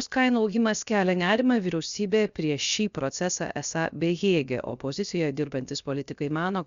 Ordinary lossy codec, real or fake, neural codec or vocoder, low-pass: Opus, 64 kbps; fake; codec, 16 kHz, 0.7 kbps, FocalCodec; 7.2 kHz